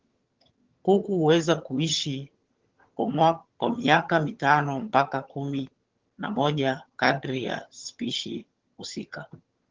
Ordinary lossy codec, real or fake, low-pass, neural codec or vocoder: Opus, 16 kbps; fake; 7.2 kHz; vocoder, 22.05 kHz, 80 mel bands, HiFi-GAN